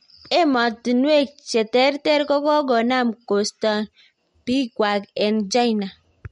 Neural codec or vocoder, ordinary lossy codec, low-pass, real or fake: none; MP3, 48 kbps; 19.8 kHz; real